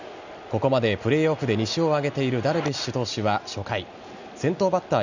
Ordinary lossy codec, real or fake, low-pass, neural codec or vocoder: none; real; 7.2 kHz; none